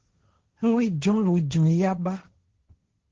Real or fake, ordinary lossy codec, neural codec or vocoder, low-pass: fake; Opus, 16 kbps; codec, 16 kHz, 1.1 kbps, Voila-Tokenizer; 7.2 kHz